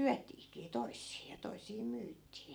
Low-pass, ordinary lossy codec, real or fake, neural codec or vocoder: none; none; fake; vocoder, 44.1 kHz, 128 mel bands every 256 samples, BigVGAN v2